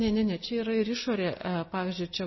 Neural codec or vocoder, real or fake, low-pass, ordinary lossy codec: none; real; 7.2 kHz; MP3, 24 kbps